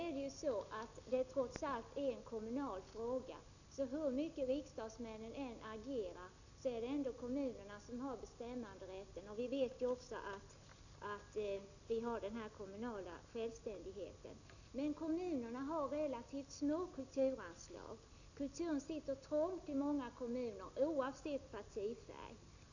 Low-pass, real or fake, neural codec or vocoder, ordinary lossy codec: 7.2 kHz; real; none; none